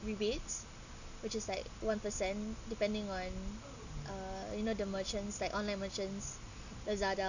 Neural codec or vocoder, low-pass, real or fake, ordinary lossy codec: none; 7.2 kHz; real; none